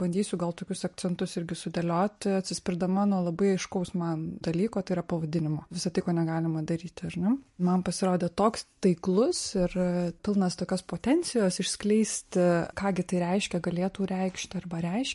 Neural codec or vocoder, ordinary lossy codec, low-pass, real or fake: none; MP3, 48 kbps; 14.4 kHz; real